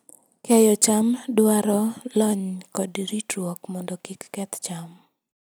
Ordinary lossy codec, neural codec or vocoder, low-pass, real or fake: none; none; none; real